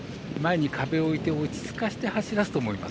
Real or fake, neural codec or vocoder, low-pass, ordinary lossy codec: real; none; none; none